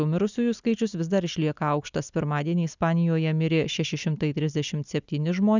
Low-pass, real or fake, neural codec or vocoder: 7.2 kHz; real; none